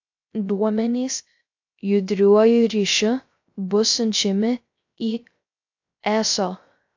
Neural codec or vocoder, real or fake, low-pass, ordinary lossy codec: codec, 16 kHz, 0.3 kbps, FocalCodec; fake; 7.2 kHz; AAC, 48 kbps